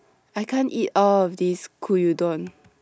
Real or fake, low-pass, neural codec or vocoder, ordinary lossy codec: real; none; none; none